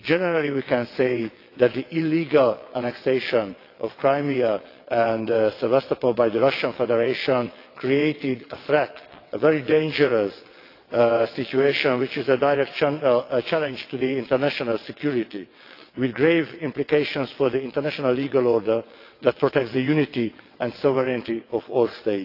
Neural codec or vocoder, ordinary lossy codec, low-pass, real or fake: vocoder, 22.05 kHz, 80 mel bands, WaveNeXt; AAC, 32 kbps; 5.4 kHz; fake